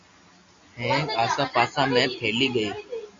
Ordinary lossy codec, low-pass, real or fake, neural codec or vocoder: MP3, 48 kbps; 7.2 kHz; real; none